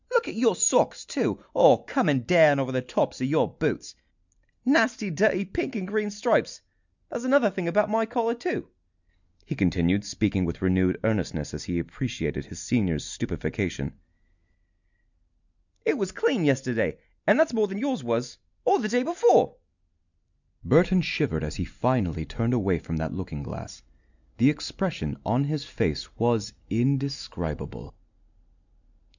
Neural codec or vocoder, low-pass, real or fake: none; 7.2 kHz; real